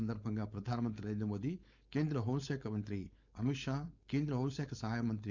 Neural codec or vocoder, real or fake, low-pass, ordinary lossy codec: codec, 16 kHz, 4.8 kbps, FACodec; fake; 7.2 kHz; Opus, 64 kbps